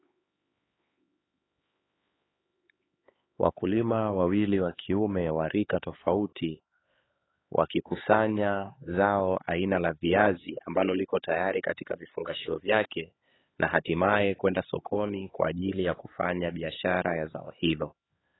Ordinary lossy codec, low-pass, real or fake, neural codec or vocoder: AAC, 16 kbps; 7.2 kHz; fake; codec, 16 kHz, 4 kbps, X-Codec, HuBERT features, trained on LibriSpeech